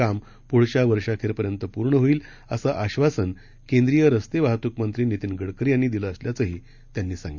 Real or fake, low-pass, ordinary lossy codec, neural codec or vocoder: real; 7.2 kHz; none; none